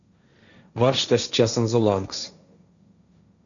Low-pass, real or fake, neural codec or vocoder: 7.2 kHz; fake; codec, 16 kHz, 1.1 kbps, Voila-Tokenizer